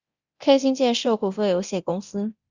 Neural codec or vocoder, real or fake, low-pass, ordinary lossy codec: codec, 24 kHz, 0.9 kbps, DualCodec; fake; 7.2 kHz; Opus, 64 kbps